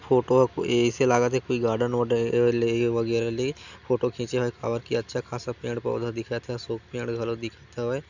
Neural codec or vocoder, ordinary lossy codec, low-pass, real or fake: none; none; 7.2 kHz; real